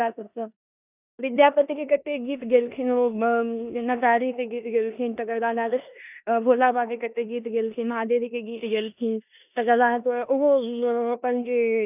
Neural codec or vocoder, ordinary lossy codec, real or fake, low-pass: codec, 16 kHz in and 24 kHz out, 0.9 kbps, LongCat-Audio-Codec, four codebook decoder; none; fake; 3.6 kHz